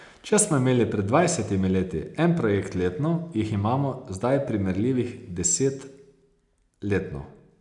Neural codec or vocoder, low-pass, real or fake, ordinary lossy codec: none; 10.8 kHz; real; none